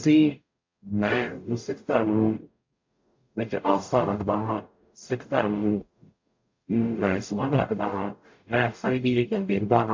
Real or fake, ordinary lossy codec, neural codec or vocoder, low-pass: fake; MP3, 48 kbps; codec, 44.1 kHz, 0.9 kbps, DAC; 7.2 kHz